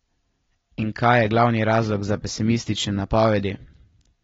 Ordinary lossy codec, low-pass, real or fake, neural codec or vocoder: AAC, 24 kbps; 7.2 kHz; real; none